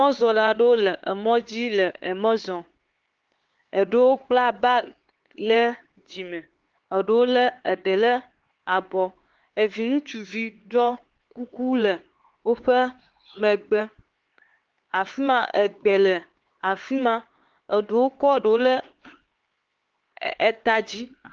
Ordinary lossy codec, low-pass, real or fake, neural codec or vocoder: Opus, 16 kbps; 7.2 kHz; fake; codec, 16 kHz, 4 kbps, X-Codec, HuBERT features, trained on LibriSpeech